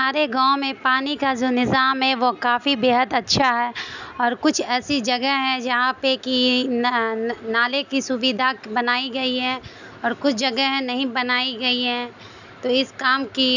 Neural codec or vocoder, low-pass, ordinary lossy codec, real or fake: none; 7.2 kHz; none; real